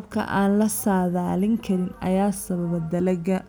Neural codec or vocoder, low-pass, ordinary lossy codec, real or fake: none; none; none; real